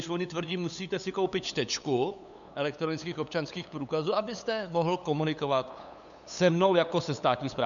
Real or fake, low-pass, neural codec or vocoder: fake; 7.2 kHz; codec, 16 kHz, 8 kbps, FunCodec, trained on LibriTTS, 25 frames a second